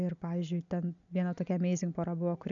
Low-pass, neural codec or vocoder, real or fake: 7.2 kHz; none; real